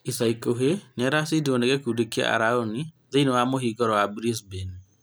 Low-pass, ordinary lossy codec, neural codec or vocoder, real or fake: none; none; none; real